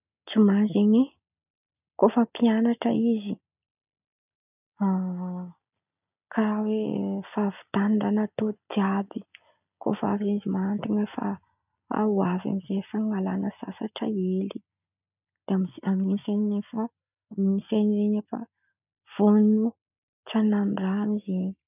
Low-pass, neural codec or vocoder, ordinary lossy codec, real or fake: 3.6 kHz; none; none; real